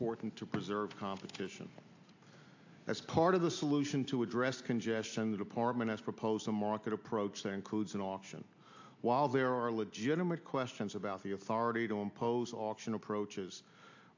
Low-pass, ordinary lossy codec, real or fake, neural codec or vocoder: 7.2 kHz; AAC, 48 kbps; real; none